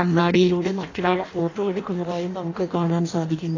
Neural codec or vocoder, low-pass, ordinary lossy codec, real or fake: codec, 16 kHz in and 24 kHz out, 0.6 kbps, FireRedTTS-2 codec; 7.2 kHz; AAC, 32 kbps; fake